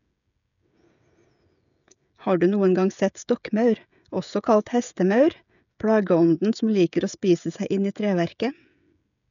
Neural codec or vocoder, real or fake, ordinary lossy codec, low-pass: codec, 16 kHz, 16 kbps, FreqCodec, smaller model; fake; none; 7.2 kHz